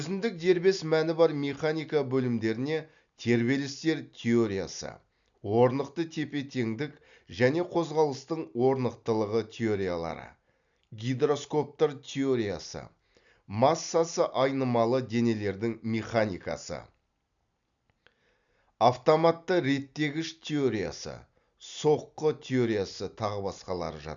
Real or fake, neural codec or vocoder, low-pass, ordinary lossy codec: real; none; 7.2 kHz; none